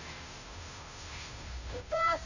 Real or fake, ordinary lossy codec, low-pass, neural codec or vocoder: fake; none; 7.2 kHz; codec, 16 kHz, 0.5 kbps, FunCodec, trained on Chinese and English, 25 frames a second